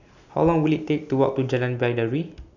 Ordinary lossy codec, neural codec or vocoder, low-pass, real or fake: none; none; 7.2 kHz; real